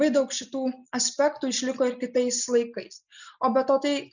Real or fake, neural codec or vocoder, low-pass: real; none; 7.2 kHz